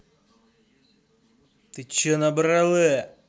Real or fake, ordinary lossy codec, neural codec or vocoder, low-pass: real; none; none; none